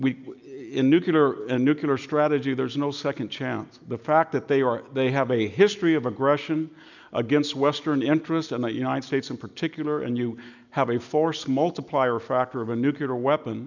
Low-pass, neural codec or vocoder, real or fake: 7.2 kHz; none; real